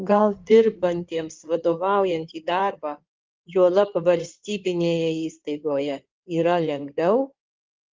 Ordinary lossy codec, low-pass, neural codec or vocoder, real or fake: Opus, 32 kbps; 7.2 kHz; codec, 16 kHz in and 24 kHz out, 2.2 kbps, FireRedTTS-2 codec; fake